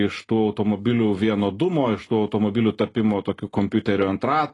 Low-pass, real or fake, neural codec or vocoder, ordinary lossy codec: 10.8 kHz; real; none; AAC, 32 kbps